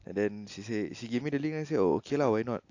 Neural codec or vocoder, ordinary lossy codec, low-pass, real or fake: none; AAC, 48 kbps; 7.2 kHz; real